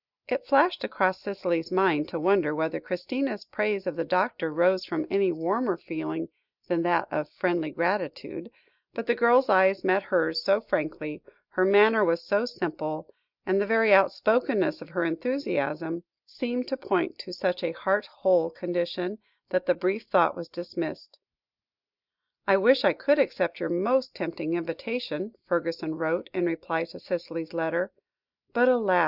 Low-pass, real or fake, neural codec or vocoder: 5.4 kHz; real; none